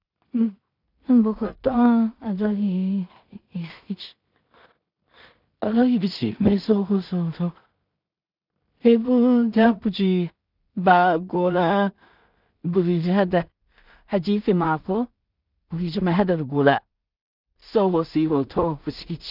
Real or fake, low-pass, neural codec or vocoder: fake; 5.4 kHz; codec, 16 kHz in and 24 kHz out, 0.4 kbps, LongCat-Audio-Codec, two codebook decoder